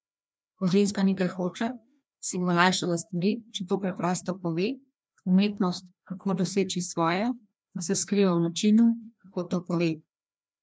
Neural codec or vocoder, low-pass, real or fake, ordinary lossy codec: codec, 16 kHz, 1 kbps, FreqCodec, larger model; none; fake; none